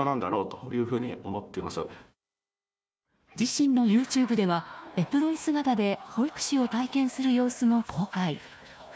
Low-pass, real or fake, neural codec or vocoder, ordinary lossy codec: none; fake; codec, 16 kHz, 1 kbps, FunCodec, trained on Chinese and English, 50 frames a second; none